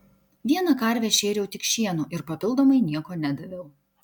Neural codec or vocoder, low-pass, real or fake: none; 19.8 kHz; real